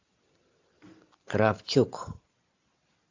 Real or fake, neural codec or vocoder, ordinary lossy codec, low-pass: real; none; AAC, 48 kbps; 7.2 kHz